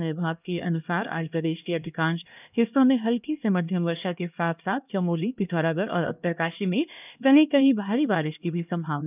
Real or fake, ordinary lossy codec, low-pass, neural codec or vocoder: fake; none; 3.6 kHz; codec, 16 kHz, 1 kbps, X-Codec, HuBERT features, trained on LibriSpeech